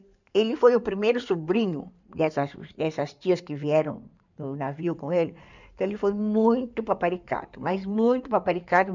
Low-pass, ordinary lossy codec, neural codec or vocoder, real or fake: 7.2 kHz; none; codec, 16 kHz in and 24 kHz out, 2.2 kbps, FireRedTTS-2 codec; fake